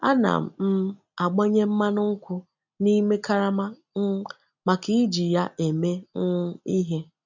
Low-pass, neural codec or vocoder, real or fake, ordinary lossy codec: 7.2 kHz; none; real; none